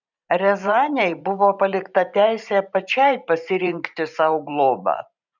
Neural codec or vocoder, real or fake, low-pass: vocoder, 44.1 kHz, 128 mel bands every 512 samples, BigVGAN v2; fake; 7.2 kHz